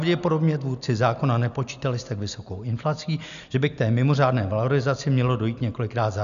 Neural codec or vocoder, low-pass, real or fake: none; 7.2 kHz; real